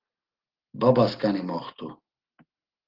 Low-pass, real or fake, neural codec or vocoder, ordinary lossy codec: 5.4 kHz; real; none; Opus, 16 kbps